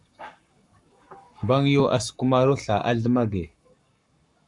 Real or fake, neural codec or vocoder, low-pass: fake; codec, 44.1 kHz, 7.8 kbps, Pupu-Codec; 10.8 kHz